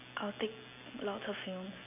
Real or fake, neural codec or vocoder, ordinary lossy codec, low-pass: real; none; none; 3.6 kHz